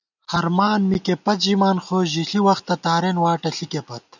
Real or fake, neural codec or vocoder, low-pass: real; none; 7.2 kHz